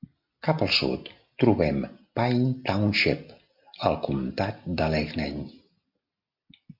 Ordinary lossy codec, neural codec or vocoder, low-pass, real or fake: MP3, 48 kbps; none; 5.4 kHz; real